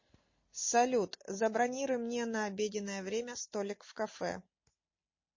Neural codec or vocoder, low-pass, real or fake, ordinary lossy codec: none; 7.2 kHz; real; MP3, 32 kbps